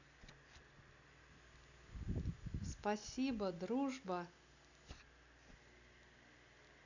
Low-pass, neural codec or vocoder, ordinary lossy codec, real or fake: 7.2 kHz; none; none; real